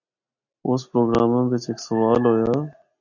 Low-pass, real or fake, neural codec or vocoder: 7.2 kHz; real; none